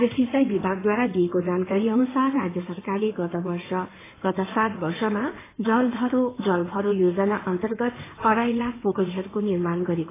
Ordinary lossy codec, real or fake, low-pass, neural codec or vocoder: AAC, 16 kbps; fake; 3.6 kHz; codec, 16 kHz in and 24 kHz out, 2.2 kbps, FireRedTTS-2 codec